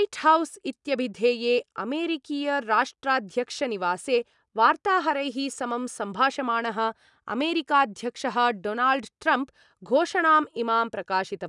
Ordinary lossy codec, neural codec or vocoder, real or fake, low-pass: none; none; real; 10.8 kHz